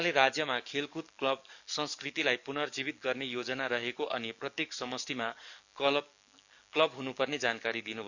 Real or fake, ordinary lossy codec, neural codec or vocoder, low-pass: fake; none; codec, 44.1 kHz, 7.8 kbps, DAC; 7.2 kHz